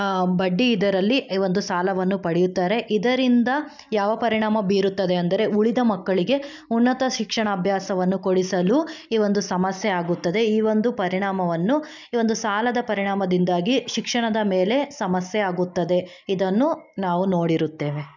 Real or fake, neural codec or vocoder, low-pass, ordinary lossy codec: real; none; 7.2 kHz; none